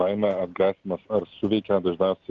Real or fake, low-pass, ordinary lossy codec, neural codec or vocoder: real; 7.2 kHz; Opus, 16 kbps; none